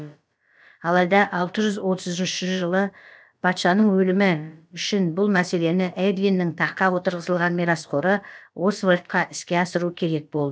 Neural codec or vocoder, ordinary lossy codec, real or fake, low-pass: codec, 16 kHz, about 1 kbps, DyCAST, with the encoder's durations; none; fake; none